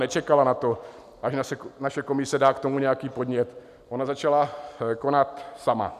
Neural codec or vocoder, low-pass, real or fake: none; 14.4 kHz; real